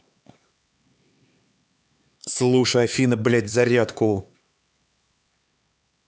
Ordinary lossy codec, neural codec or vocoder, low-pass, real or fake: none; codec, 16 kHz, 4 kbps, X-Codec, HuBERT features, trained on LibriSpeech; none; fake